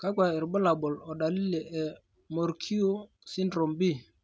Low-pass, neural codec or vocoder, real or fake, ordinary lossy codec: none; none; real; none